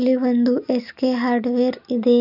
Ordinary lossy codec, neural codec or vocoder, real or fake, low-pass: none; none; real; 5.4 kHz